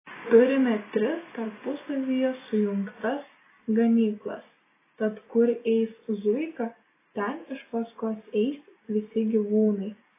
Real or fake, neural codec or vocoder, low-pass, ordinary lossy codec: real; none; 3.6 kHz; AAC, 16 kbps